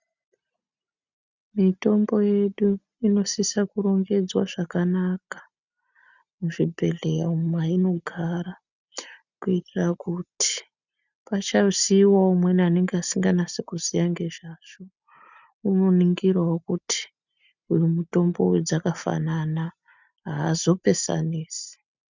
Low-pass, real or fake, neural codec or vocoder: 7.2 kHz; real; none